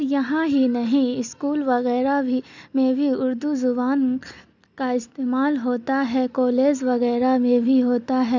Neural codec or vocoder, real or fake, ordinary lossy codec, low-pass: none; real; none; 7.2 kHz